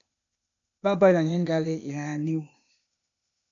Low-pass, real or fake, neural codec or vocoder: 7.2 kHz; fake; codec, 16 kHz, 0.8 kbps, ZipCodec